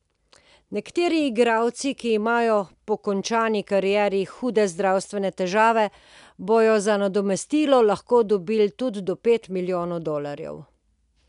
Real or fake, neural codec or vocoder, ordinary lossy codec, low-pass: real; none; none; 10.8 kHz